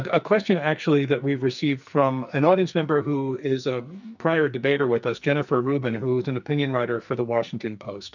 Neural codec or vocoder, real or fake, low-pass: codec, 44.1 kHz, 2.6 kbps, SNAC; fake; 7.2 kHz